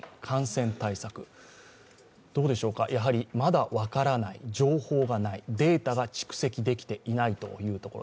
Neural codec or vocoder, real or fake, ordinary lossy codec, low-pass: none; real; none; none